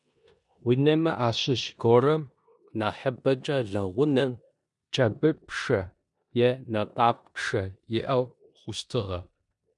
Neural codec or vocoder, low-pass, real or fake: codec, 16 kHz in and 24 kHz out, 0.9 kbps, LongCat-Audio-Codec, four codebook decoder; 10.8 kHz; fake